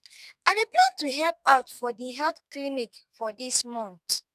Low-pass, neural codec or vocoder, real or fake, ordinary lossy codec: 14.4 kHz; codec, 32 kHz, 1.9 kbps, SNAC; fake; none